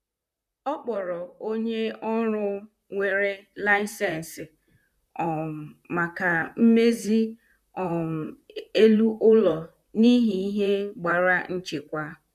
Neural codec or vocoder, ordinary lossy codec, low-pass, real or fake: vocoder, 44.1 kHz, 128 mel bands, Pupu-Vocoder; none; 14.4 kHz; fake